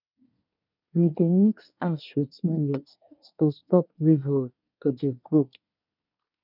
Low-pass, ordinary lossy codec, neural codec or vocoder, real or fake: 5.4 kHz; none; codec, 24 kHz, 1 kbps, SNAC; fake